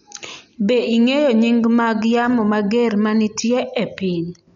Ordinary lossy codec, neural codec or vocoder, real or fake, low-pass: none; none; real; 7.2 kHz